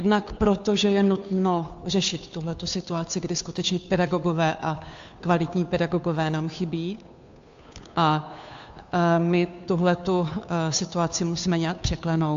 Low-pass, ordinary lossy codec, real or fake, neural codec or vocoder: 7.2 kHz; AAC, 64 kbps; fake; codec, 16 kHz, 2 kbps, FunCodec, trained on Chinese and English, 25 frames a second